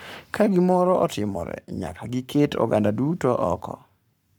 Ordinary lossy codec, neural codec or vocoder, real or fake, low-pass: none; codec, 44.1 kHz, 7.8 kbps, Pupu-Codec; fake; none